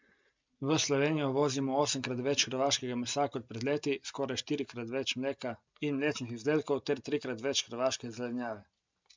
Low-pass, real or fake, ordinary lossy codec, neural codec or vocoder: 7.2 kHz; real; MP3, 64 kbps; none